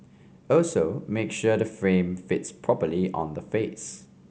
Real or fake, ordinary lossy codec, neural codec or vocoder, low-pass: real; none; none; none